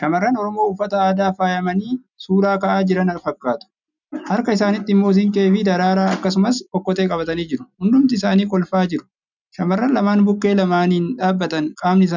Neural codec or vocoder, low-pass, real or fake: none; 7.2 kHz; real